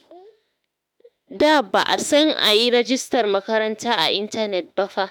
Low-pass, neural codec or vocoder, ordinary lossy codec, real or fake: none; autoencoder, 48 kHz, 32 numbers a frame, DAC-VAE, trained on Japanese speech; none; fake